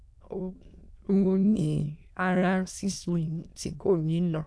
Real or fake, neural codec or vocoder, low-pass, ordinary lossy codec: fake; autoencoder, 22.05 kHz, a latent of 192 numbers a frame, VITS, trained on many speakers; none; none